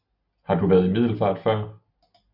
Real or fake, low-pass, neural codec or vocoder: real; 5.4 kHz; none